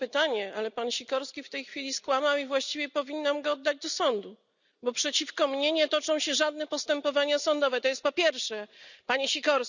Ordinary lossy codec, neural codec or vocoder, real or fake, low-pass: none; none; real; 7.2 kHz